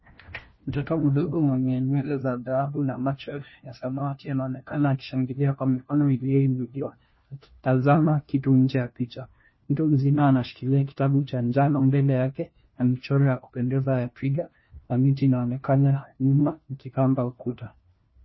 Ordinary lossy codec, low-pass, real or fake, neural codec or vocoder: MP3, 24 kbps; 7.2 kHz; fake; codec, 16 kHz, 1 kbps, FunCodec, trained on LibriTTS, 50 frames a second